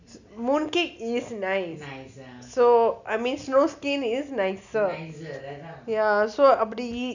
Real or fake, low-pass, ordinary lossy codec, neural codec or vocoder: real; 7.2 kHz; none; none